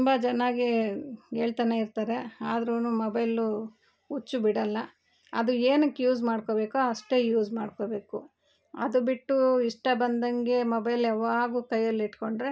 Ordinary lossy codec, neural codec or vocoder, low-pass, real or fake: none; none; none; real